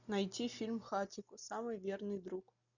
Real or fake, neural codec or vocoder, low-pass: real; none; 7.2 kHz